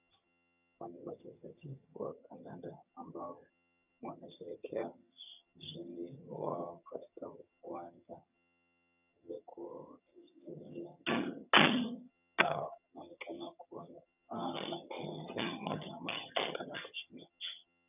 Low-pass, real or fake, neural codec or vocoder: 3.6 kHz; fake; vocoder, 22.05 kHz, 80 mel bands, HiFi-GAN